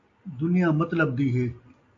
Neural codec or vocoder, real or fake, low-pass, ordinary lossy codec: none; real; 7.2 kHz; AAC, 48 kbps